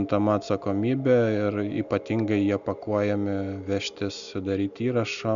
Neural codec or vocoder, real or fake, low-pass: none; real; 7.2 kHz